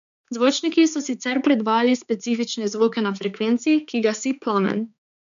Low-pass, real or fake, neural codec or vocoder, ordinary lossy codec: 7.2 kHz; fake; codec, 16 kHz, 4 kbps, X-Codec, HuBERT features, trained on balanced general audio; MP3, 96 kbps